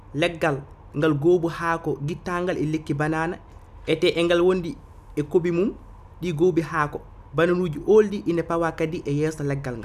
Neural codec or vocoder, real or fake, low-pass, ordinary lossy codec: none; real; 14.4 kHz; none